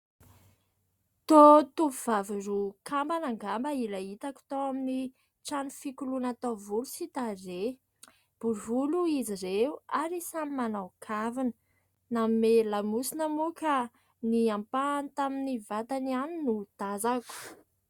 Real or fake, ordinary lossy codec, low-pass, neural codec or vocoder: real; Opus, 64 kbps; 19.8 kHz; none